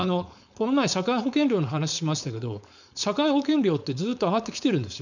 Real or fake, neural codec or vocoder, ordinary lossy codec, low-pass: fake; codec, 16 kHz, 4.8 kbps, FACodec; none; 7.2 kHz